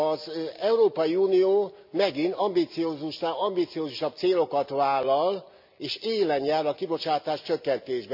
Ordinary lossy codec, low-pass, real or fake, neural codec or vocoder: MP3, 48 kbps; 5.4 kHz; real; none